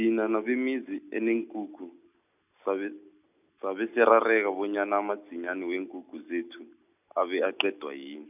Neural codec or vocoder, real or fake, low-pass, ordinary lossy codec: none; real; 3.6 kHz; none